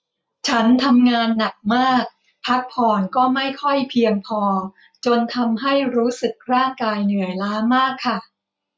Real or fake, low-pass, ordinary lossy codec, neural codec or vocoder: real; none; none; none